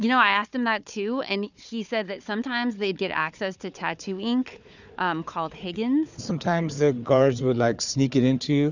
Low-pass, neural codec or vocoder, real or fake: 7.2 kHz; codec, 16 kHz, 4 kbps, FunCodec, trained on Chinese and English, 50 frames a second; fake